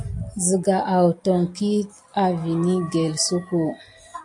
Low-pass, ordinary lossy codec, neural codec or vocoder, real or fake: 10.8 kHz; AAC, 64 kbps; none; real